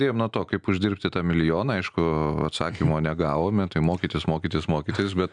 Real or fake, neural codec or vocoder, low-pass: real; none; 9.9 kHz